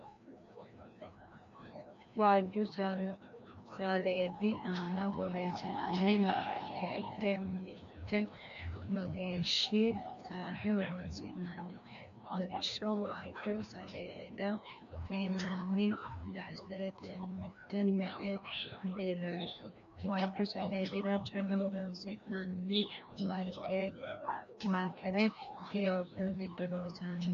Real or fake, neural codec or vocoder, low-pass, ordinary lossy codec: fake; codec, 16 kHz, 1 kbps, FreqCodec, larger model; 7.2 kHz; AAC, 64 kbps